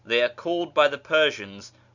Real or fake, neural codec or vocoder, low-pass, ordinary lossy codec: real; none; 7.2 kHz; Opus, 64 kbps